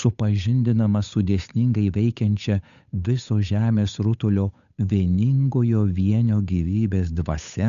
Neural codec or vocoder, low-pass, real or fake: codec, 16 kHz, 8 kbps, FunCodec, trained on Chinese and English, 25 frames a second; 7.2 kHz; fake